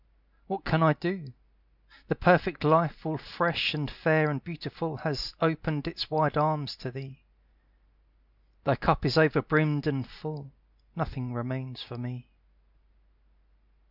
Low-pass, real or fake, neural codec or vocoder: 5.4 kHz; real; none